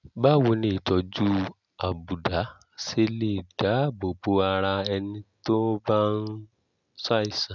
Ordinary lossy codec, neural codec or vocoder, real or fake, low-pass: none; none; real; 7.2 kHz